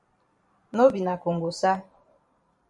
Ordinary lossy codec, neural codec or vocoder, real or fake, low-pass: MP3, 64 kbps; vocoder, 44.1 kHz, 128 mel bands every 512 samples, BigVGAN v2; fake; 10.8 kHz